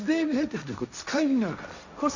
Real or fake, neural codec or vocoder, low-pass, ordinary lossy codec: fake; codec, 16 kHz, 1.1 kbps, Voila-Tokenizer; 7.2 kHz; none